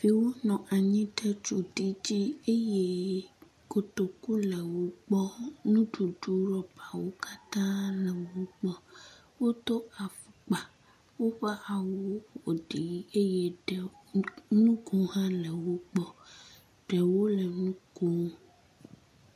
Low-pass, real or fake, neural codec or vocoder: 14.4 kHz; real; none